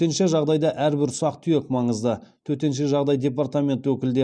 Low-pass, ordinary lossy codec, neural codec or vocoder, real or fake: 9.9 kHz; none; none; real